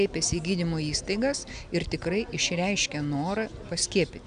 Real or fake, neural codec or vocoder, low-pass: real; none; 9.9 kHz